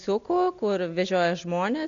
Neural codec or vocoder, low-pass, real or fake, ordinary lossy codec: none; 7.2 kHz; real; MP3, 64 kbps